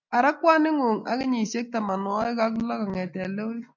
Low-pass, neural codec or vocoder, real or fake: 7.2 kHz; none; real